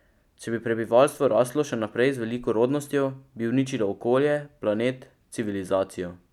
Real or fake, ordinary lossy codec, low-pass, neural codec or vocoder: real; none; 19.8 kHz; none